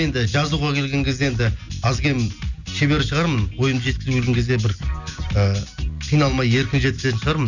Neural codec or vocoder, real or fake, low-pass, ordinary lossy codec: none; real; 7.2 kHz; none